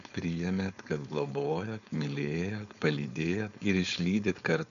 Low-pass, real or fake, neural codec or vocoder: 7.2 kHz; fake; codec, 16 kHz, 4.8 kbps, FACodec